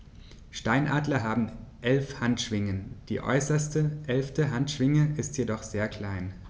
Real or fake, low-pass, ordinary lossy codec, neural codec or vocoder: real; none; none; none